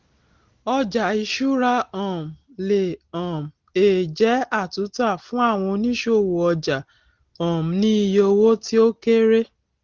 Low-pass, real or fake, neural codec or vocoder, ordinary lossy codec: 7.2 kHz; real; none; Opus, 16 kbps